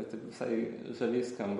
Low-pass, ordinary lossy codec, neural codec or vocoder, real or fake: 19.8 kHz; MP3, 48 kbps; none; real